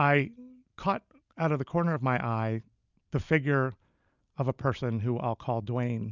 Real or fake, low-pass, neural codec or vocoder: real; 7.2 kHz; none